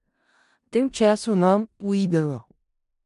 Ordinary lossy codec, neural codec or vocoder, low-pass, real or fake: MP3, 96 kbps; codec, 16 kHz in and 24 kHz out, 0.4 kbps, LongCat-Audio-Codec, four codebook decoder; 10.8 kHz; fake